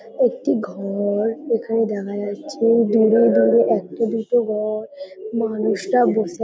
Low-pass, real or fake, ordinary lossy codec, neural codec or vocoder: none; real; none; none